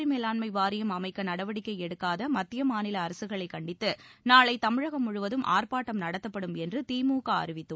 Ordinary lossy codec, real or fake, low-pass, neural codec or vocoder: none; real; none; none